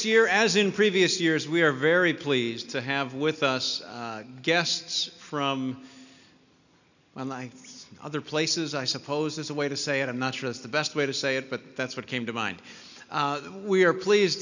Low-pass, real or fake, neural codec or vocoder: 7.2 kHz; real; none